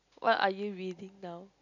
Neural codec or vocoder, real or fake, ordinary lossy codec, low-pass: none; real; none; 7.2 kHz